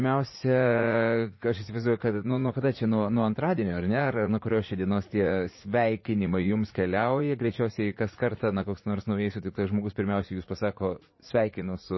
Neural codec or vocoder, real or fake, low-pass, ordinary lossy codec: vocoder, 44.1 kHz, 80 mel bands, Vocos; fake; 7.2 kHz; MP3, 24 kbps